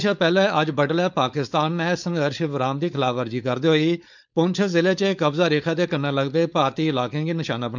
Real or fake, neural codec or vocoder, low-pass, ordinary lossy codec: fake; codec, 16 kHz, 4.8 kbps, FACodec; 7.2 kHz; none